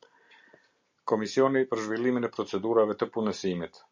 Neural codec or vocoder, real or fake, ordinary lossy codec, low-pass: none; real; MP3, 64 kbps; 7.2 kHz